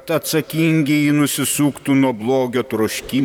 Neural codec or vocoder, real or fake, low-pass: vocoder, 44.1 kHz, 128 mel bands, Pupu-Vocoder; fake; 19.8 kHz